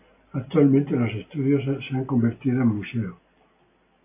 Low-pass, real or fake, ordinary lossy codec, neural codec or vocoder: 3.6 kHz; real; AAC, 24 kbps; none